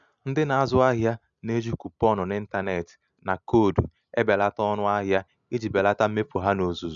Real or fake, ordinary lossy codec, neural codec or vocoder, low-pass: real; none; none; 7.2 kHz